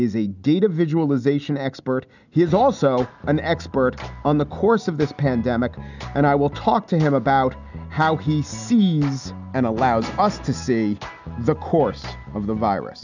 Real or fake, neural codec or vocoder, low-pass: real; none; 7.2 kHz